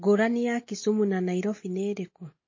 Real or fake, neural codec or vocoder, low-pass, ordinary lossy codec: real; none; 7.2 kHz; MP3, 32 kbps